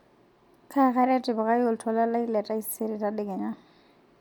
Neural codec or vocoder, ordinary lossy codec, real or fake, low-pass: none; MP3, 96 kbps; real; 19.8 kHz